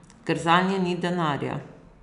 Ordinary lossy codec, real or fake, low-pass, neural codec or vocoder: none; real; 10.8 kHz; none